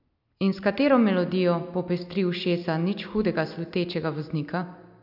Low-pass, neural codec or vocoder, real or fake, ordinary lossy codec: 5.4 kHz; none; real; none